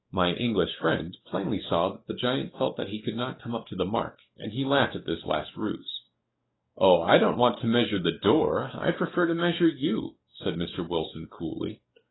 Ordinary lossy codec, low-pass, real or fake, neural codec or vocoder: AAC, 16 kbps; 7.2 kHz; fake; codec, 44.1 kHz, 7.8 kbps, DAC